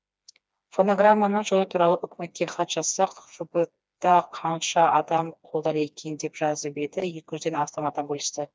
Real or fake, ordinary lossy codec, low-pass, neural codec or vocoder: fake; none; none; codec, 16 kHz, 2 kbps, FreqCodec, smaller model